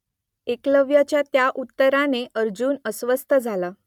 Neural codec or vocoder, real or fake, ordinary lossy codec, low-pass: none; real; none; 19.8 kHz